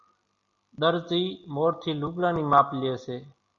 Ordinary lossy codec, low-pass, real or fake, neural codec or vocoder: Opus, 64 kbps; 7.2 kHz; real; none